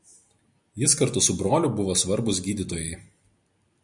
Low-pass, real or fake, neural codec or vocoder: 10.8 kHz; real; none